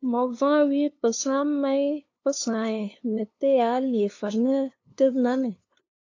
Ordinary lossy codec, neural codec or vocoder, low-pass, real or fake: AAC, 48 kbps; codec, 16 kHz, 2 kbps, FunCodec, trained on LibriTTS, 25 frames a second; 7.2 kHz; fake